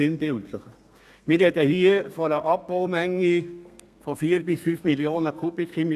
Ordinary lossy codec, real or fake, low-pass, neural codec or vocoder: none; fake; 14.4 kHz; codec, 32 kHz, 1.9 kbps, SNAC